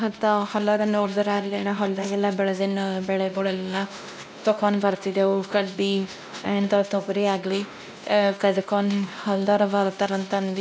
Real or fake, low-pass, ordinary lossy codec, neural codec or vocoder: fake; none; none; codec, 16 kHz, 1 kbps, X-Codec, WavLM features, trained on Multilingual LibriSpeech